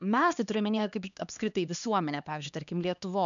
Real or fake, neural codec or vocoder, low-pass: fake; codec, 16 kHz, 2 kbps, X-Codec, HuBERT features, trained on LibriSpeech; 7.2 kHz